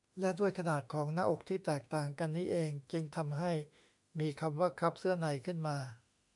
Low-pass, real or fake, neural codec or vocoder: 10.8 kHz; fake; autoencoder, 48 kHz, 32 numbers a frame, DAC-VAE, trained on Japanese speech